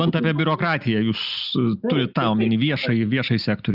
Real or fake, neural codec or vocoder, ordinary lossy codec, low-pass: real; none; Opus, 64 kbps; 5.4 kHz